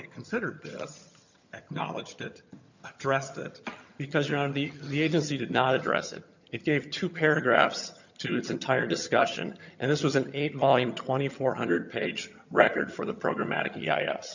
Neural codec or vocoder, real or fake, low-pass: vocoder, 22.05 kHz, 80 mel bands, HiFi-GAN; fake; 7.2 kHz